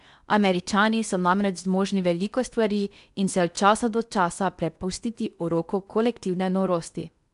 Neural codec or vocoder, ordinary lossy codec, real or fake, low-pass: codec, 16 kHz in and 24 kHz out, 0.8 kbps, FocalCodec, streaming, 65536 codes; none; fake; 10.8 kHz